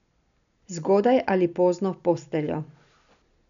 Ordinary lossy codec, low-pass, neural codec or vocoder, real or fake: none; 7.2 kHz; none; real